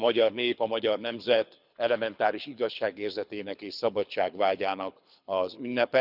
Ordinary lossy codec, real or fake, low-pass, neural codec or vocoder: none; fake; 5.4 kHz; codec, 24 kHz, 6 kbps, HILCodec